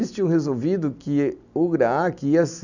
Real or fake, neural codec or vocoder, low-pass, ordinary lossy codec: real; none; 7.2 kHz; none